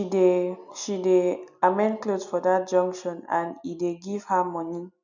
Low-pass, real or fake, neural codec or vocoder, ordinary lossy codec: 7.2 kHz; real; none; none